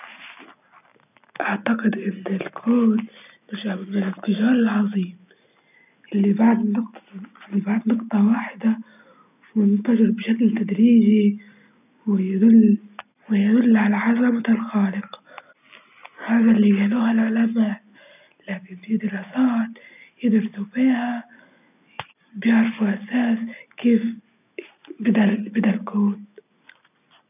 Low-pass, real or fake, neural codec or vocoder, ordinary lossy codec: 3.6 kHz; real; none; none